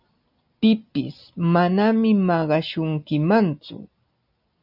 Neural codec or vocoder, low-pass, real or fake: none; 5.4 kHz; real